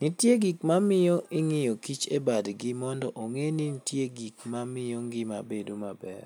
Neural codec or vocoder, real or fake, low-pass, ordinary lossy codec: none; real; none; none